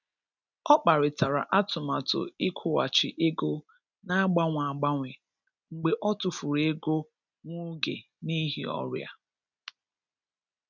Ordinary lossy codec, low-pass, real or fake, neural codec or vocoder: none; 7.2 kHz; real; none